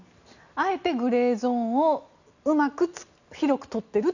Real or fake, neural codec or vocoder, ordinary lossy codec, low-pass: real; none; none; 7.2 kHz